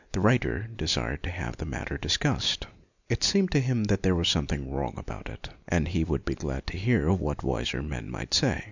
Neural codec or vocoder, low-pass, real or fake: none; 7.2 kHz; real